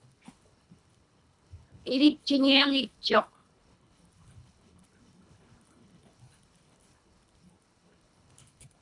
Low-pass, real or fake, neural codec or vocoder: 10.8 kHz; fake; codec, 24 kHz, 1.5 kbps, HILCodec